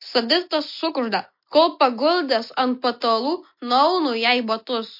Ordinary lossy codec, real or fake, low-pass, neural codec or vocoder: MP3, 32 kbps; real; 5.4 kHz; none